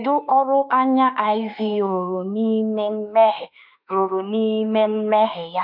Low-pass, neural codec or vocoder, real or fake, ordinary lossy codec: 5.4 kHz; autoencoder, 48 kHz, 32 numbers a frame, DAC-VAE, trained on Japanese speech; fake; none